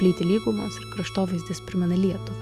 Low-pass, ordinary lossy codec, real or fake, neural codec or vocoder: 14.4 kHz; Opus, 64 kbps; real; none